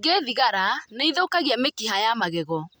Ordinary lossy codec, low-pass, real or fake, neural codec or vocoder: none; none; real; none